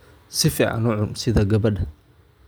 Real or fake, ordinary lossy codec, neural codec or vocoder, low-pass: real; none; none; none